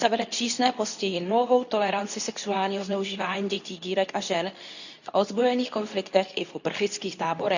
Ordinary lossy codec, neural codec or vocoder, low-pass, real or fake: none; codec, 24 kHz, 0.9 kbps, WavTokenizer, medium speech release version 2; 7.2 kHz; fake